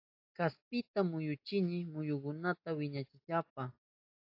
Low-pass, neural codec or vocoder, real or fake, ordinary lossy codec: 5.4 kHz; none; real; AAC, 32 kbps